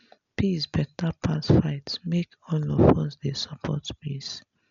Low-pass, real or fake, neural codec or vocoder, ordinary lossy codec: 7.2 kHz; real; none; none